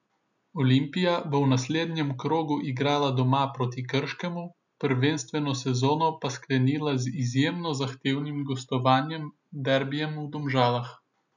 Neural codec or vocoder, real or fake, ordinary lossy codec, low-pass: none; real; none; 7.2 kHz